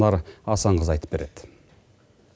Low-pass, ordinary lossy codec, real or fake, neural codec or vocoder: none; none; real; none